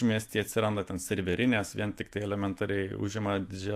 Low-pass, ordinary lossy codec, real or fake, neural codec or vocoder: 14.4 kHz; AAC, 64 kbps; fake; autoencoder, 48 kHz, 128 numbers a frame, DAC-VAE, trained on Japanese speech